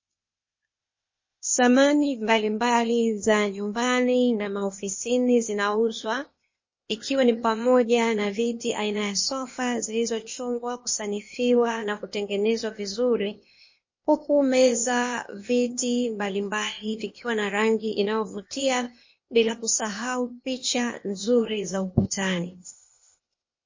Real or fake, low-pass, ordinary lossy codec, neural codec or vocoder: fake; 7.2 kHz; MP3, 32 kbps; codec, 16 kHz, 0.8 kbps, ZipCodec